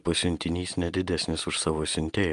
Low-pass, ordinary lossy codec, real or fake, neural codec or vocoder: 10.8 kHz; Opus, 32 kbps; real; none